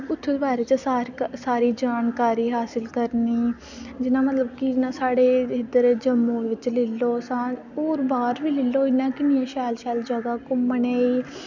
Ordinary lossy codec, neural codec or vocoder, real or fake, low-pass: none; none; real; 7.2 kHz